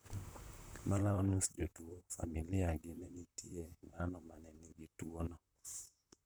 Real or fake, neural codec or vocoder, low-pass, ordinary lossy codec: fake; vocoder, 44.1 kHz, 128 mel bands, Pupu-Vocoder; none; none